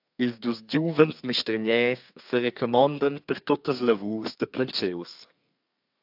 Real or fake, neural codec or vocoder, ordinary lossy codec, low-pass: fake; codec, 32 kHz, 1.9 kbps, SNAC; AAC, 48 kbps; 5.4 kHz